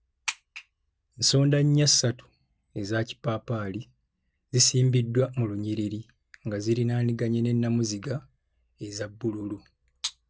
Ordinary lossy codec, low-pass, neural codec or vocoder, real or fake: none; none; none; real